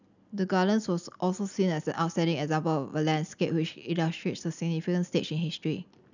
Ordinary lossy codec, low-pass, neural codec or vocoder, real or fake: none; 7.2 kHz; none; real